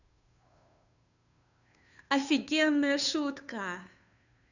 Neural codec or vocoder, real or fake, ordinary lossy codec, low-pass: codec, 16 kHz, 2 kbps, FunCodec, trained on Chinese and English, 25 frames a second; fake; none; 7.2 kHz